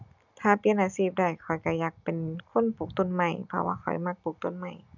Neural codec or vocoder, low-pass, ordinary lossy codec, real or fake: none; 7.2 kHz; none; real